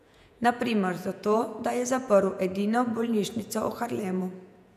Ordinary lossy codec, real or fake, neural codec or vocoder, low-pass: none; fake; vocoder, 48 kHz, 128 mel bands, Vocos; 14.4 kHz